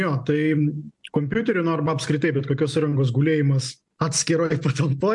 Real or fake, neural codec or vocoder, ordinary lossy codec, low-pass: real; none; MP3, 64 kbps; 10.8 kHz